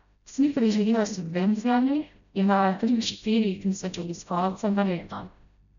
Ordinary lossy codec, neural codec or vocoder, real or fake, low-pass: none; codec, 16 kHz, 0.5 kbps, FreqCodec, smaller model; fake; 7.2 kHz